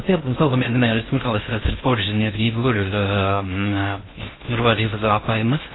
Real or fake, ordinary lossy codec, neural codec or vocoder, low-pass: fake; AAC, 16 kbps; codec, 16 kHz in and 24 kHz out, 0.6 kbps, FocalCodec, streaming, 4096 codes; 7.2 kHz